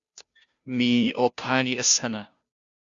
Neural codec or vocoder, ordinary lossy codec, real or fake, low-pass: codec, 16 kHz, 0.5 kbps, FunCodec, trained on Chinese and English, 25 frames a second; Opus, 64 kbps; fake; 7.2 kHz